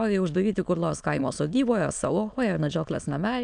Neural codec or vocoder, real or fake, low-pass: autoencoder, 22.05 kHz, a latent of 192 numbers a frame, VITS, trained on many speakers; fake; 9.9 kHz